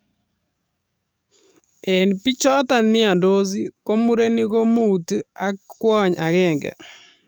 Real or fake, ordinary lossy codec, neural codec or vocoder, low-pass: fake; none; codec, 44.1 kHz, 7.8 kbps, DAC; none